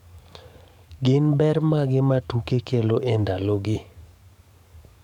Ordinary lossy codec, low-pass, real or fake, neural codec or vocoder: none; 19.8 kHz; fake; codec, 44.1 kHz, 7.8 kbps, DAC